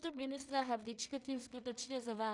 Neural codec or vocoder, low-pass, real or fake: codec, 16 kHz in and 24 kHz out, 0.4 kbps, LongCat-Audio-Codec, two codebook decoder; 10.8 kHz; fake